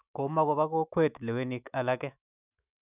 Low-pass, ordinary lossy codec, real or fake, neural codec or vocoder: 3.6 kHz; none; fake; autoencoder, 48 kHz, 128 numbers a frame, DAC-VAE, trained on Japanese speech